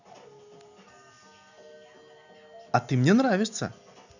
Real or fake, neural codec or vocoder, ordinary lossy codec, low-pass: real; none; none; 7.2 kHz